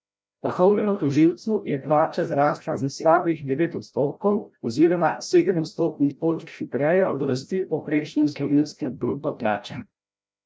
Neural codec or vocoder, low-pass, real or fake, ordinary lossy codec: codec, 16 kHz, 0.5 kbps, FreqCodec, larger model; none; fake; none